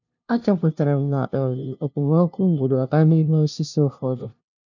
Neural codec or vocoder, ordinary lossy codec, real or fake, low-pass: codec, 16 kHz, 0.5 kbps, FunCodec, trained on LibriTTS, 25 frames a second; none; fake; 7.2 kHz